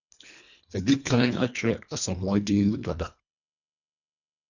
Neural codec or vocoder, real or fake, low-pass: codec, 24 kHz, 1.5 kbps, HILCodec; fake; 7.2 kHz